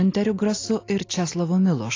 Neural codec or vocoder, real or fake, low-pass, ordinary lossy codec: none; real; 7.2 kHz; AAC, 32 kbps